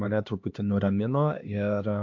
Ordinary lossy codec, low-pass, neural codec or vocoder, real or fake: AAC, 48 kbps; 7.2 kHz; codec, 16 kHz, 2 kbps, X-Codec, HuBERT features, trained on LibriSpeech; fake